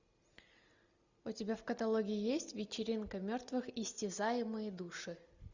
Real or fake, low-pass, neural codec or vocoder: real; 7.2 kHz; none